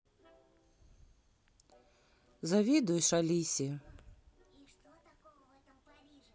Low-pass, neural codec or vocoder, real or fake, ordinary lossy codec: none; none; real; none